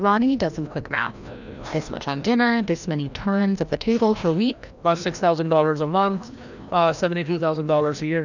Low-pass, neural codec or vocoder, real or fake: 7.2 kHz; codec, 16 kHz, 1 kbps, FreqCodec, larger model; fake